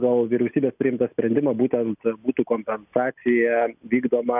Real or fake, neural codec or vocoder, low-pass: real; none; 3.6 kHz